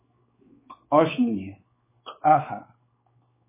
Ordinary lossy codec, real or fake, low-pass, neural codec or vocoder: MP3, 16 kbps; fake; 3.6 kHz; codec, 16 kHz, 4 kbps, X-Codec, WavLM features, trained on Multilingual LibriSpeech